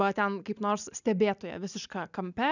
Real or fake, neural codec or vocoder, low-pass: real; none; 7.2 kHz